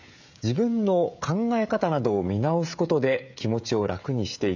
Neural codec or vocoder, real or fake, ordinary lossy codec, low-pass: codec, 16 kHz, 16 kbps, FreqCodec, smaller model; fake; none; 7.2 kHz